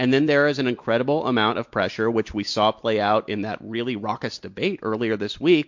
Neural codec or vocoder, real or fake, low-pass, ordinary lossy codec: none; real; 7.2 kHz; MP3, 48 kbps